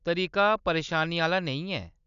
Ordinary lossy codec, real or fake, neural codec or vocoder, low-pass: none; real; none; 7.2 kHz